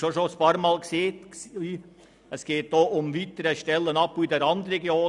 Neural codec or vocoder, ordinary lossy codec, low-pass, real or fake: none; none; 10.8 kHz; real